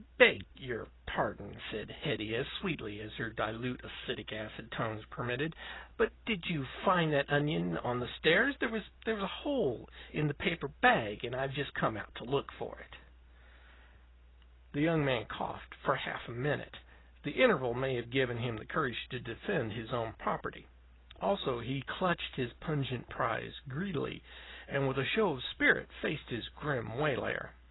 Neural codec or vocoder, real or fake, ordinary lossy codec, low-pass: none; real; AAC, 16 kbps; 7.2 kHz